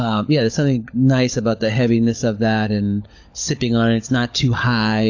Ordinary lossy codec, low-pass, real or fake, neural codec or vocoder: AAC, 48 kbps; 7.2 kHz; fake; codec, 16 kHz, 16 kbps, FunCodec, trained on LibriTTS, 50 frames a second